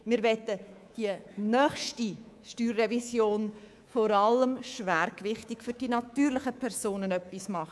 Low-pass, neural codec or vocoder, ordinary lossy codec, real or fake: none; codec, 24 kHz, 3.1 kbps, DualCodec; none; fake